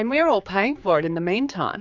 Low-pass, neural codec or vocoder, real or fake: 7.2 kHz; codec, 16 kHz, 4 kbps, X-Codec, HuBERT features, trained on general audio; fake